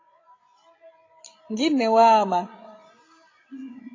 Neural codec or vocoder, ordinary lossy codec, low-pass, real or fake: codec, 16 kHz, 16 kbps, FreqCodec, larger model; AAC, 32 kbps; 7.2 kHz; fake